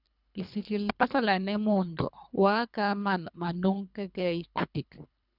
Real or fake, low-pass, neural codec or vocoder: fake; 5.4 kHz; codec, 24 kHz, 3 kbps, HILCodec